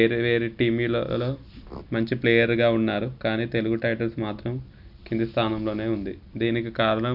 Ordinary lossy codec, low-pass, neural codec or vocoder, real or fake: AAC, 48 kbps; 5.4 kHz; none; real